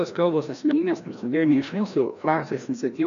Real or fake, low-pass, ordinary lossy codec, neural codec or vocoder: fake; 7.2 kHz; MP3, 96 kbps; codec, 16 kHz, 1 kbps, FreqCodec, larger model